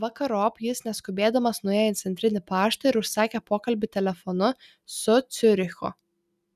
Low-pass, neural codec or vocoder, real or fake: 14.4 kHz; none; real